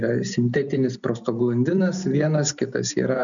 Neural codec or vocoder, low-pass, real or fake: none; 7.2 kHz; real